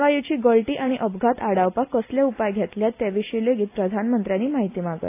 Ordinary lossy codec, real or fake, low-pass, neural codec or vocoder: AAC, 24 kbps; real; 3.6 kHz; none